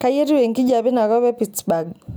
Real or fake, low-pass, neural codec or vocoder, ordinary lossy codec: real; none; none; none